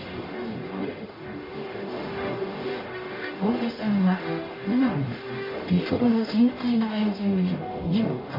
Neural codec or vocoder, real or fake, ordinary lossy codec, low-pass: codec, 44.1 kHz, 0.9 kbps, DAC; fake; MP3, 24 kbps; 5.4 kHz